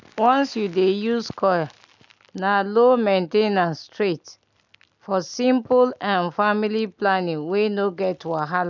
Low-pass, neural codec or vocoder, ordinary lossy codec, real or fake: 7.2 kHz; none; none; real